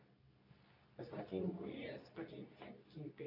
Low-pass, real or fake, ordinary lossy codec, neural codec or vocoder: 5.4 kHz; fake; none; codec, 24 kHz, 0.9 kbps, WavTokenizer, medium speech release version 2